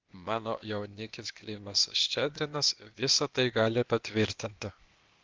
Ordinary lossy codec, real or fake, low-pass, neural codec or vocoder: Opus, 32 kbps; fake; 7.2 kHz; codec, 16 kHz, 0.8 kbps, ZipCodec